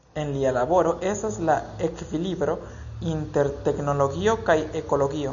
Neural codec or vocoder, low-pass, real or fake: none; 7.2 kHz; real